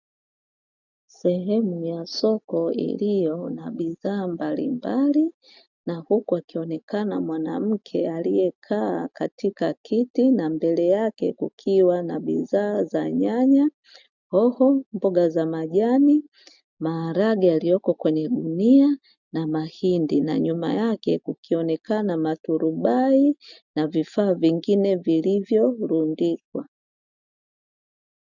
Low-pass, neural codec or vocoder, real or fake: 7.2 kHz; none; real